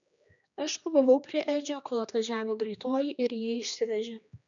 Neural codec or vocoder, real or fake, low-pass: codec, 16 kHz, 2 kbps, X-Codec, HuBERT features, trained on general audio; fake; 7.2 kHz